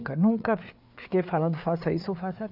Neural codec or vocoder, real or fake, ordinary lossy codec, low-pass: codec, 16 kHz, 4 kbps, FreqCodec, larger model; fake; none; 5.4 kHz